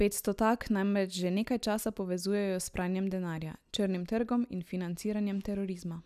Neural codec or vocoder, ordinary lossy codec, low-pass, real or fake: none; none; 14.4 kHz; real